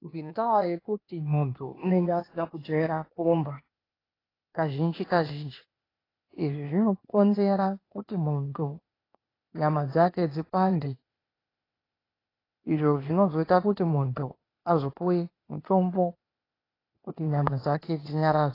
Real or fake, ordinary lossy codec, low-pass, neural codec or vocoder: fake; AAC, 24 kbps; 5.4 kHz; codec, 16 kHz, 0.8 kbps, ZipCodec